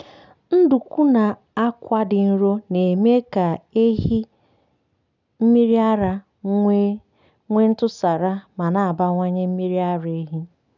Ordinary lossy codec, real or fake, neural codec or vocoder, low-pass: none; real; none; 7.2 kHz